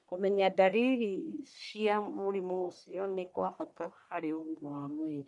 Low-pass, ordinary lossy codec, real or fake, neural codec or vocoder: 10.8 kHz; none; fake; codec, 44.1 kHz, 1.7 kbps, Pupu-Codec